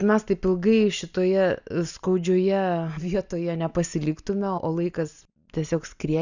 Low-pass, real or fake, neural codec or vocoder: 7.2 kHz; real; none